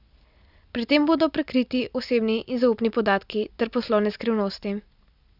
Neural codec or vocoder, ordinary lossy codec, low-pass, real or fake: none; none; 5.4 kHz; real